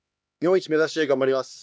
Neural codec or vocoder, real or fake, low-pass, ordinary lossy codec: codec, 16 kHz, 2 kbps, X-Codec, HuBERT features, trained on LibriSpeech; fake; none; none